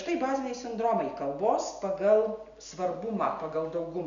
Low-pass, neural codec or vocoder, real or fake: 7.2 kHz; none; real